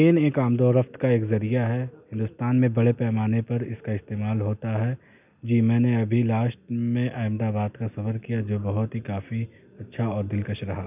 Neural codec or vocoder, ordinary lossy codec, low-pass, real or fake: none; none; 3.6 kHz; real